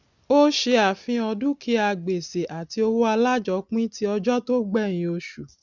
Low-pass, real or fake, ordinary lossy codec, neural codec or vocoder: 7.2 kHz; real; none; none